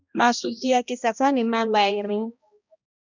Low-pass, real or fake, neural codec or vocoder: 7.2 kHz; fake; codec, 16 kHz, 1 kbps, X-Codec, HuBERT features, trained on balanced general audio